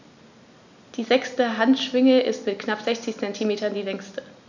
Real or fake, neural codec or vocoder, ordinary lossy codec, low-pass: real; none; none; 7.2 kHz